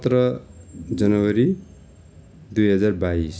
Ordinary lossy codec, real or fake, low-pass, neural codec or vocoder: none; real; none; none